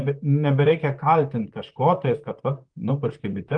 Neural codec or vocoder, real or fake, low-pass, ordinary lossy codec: vocoder, 44.1 kHz, 128 mel bands, Pupu-Vocoder; fake; 9.9 kHz; Opus, 32 kbps